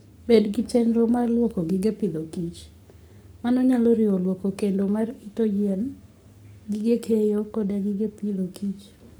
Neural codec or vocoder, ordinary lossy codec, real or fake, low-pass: codec, 44.1 kHz, 7.8 kbps, Pupu-Codec; none; fake; none